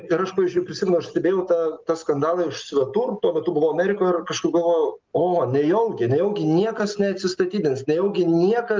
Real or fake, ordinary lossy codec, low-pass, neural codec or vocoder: real; Opus, 32 kbps; 7.2 kHz; none